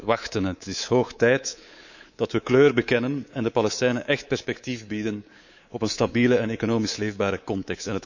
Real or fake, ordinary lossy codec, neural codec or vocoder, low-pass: fake; none; codec, 24 kHz, 3.1 kbps, DualCodec; 7.2 kHz